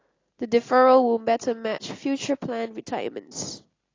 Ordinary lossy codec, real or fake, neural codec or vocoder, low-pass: AAC, 32 kbps; real; none; 7.2 kHz